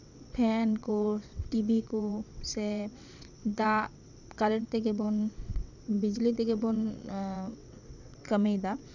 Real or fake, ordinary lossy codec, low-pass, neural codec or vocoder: fake; none; 7.2 kHz; vocoder, 22.05 kHz, 80 mel bands, WaveNeXt